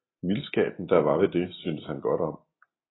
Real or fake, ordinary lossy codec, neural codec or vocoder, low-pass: real; AAC, 16 kbps; none; 7.2 kHz